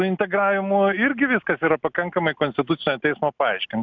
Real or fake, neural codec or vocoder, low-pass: real; none; 7.2 kHz